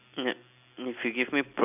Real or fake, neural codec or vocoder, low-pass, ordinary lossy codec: real; none; 3.6 kHz; none